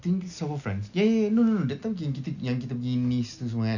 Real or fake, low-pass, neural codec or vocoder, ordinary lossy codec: real; 7.2 kHz; none; none